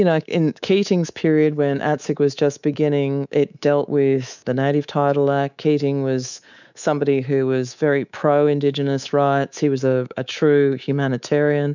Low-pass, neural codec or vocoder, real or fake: 7.2 kHz; codec, 24 kHz, 3.1 kbps, DualCodec; fake